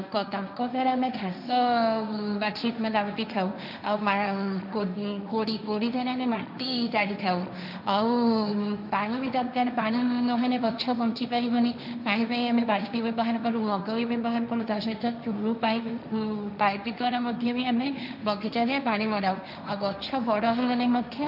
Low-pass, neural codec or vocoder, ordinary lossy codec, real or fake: 5.4 kHz; codec, 16 kHz, 1.1 kbps, Voila-Tokenizer; none; fake